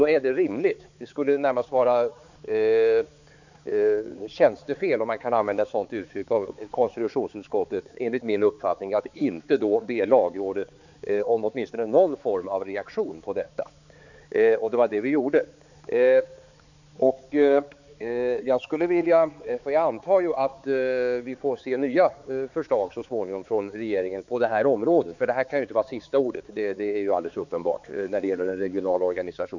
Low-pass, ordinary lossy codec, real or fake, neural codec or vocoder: 7.2 kHz; none; fake; codec, 16 kHz, 4 kbps, X-Codec, HuBERT features, trained on balanced general audio